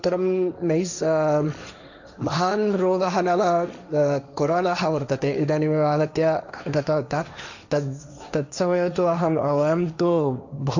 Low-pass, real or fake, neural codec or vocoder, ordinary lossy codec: 7.2 kHz; fake; codec, 16 kHz, 1.1 kbps, Voila-Tokenizer; none